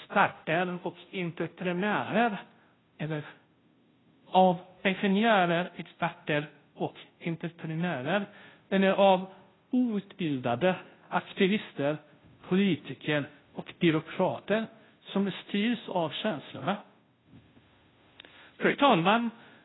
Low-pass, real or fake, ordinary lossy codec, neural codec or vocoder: 7.2 kHz; fake; AAC, 16 kbps; codec, 16 kHz, 0.5 kbps, FunCodec, trained on Chinese and English, 25 frames a second